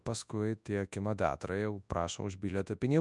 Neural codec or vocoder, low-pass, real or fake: codec, 24 kHz, 0.9 kbps, WavTokenizer, large speech release; 10.8 kHz; fake